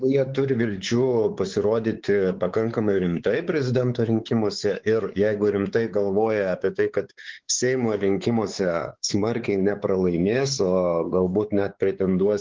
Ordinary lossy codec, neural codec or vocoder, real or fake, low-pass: Opus, 16 kbps; codec, 16 kHz, 4 kbps, X-Codec, WavLM features, trained on Multilingual LibriSpeech; fake; 7.2 kHz